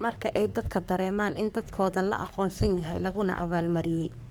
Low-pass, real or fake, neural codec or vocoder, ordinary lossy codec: none; fake; codec, 44.1 kHz, 3.4 kbps, Pupu-Codec; none